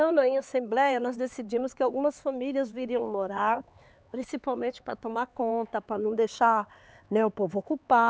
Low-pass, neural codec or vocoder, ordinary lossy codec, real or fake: none; codec, 16 kHz, 4 kbps, X-Codec, HuBERT features, trained on LibriSpeech; none; fake